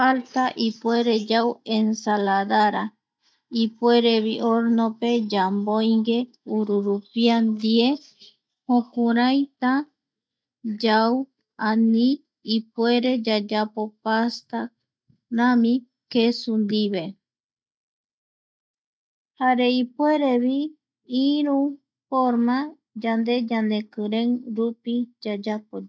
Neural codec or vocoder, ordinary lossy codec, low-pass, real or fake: none; none; none; real